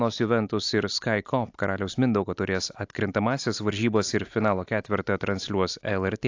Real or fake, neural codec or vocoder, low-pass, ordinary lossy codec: real; none; 7.2 kHz; AAC, 48 kbps